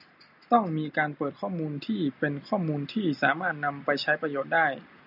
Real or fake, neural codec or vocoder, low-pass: real; none; 5.4 kHz